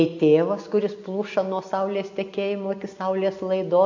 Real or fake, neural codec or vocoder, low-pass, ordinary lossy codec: real; none; 7.2 kHz; AAC, 48 kbps